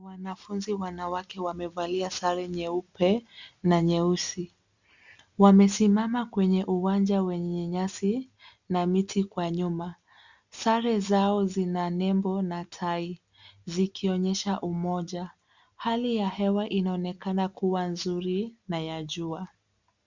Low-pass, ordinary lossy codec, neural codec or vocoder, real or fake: 7.2 kHz; Opus, 64 kbps; none; real